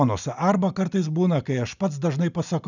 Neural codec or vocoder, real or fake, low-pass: none; real; 7.2 kHz